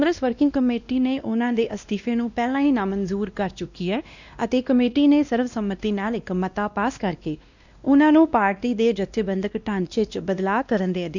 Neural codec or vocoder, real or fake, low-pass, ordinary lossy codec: codec, 16 kHz, 1 kbps, X-Codec, WavLM features, trained on Multilingual LibriSpeech; fake; 7.2 kHz; none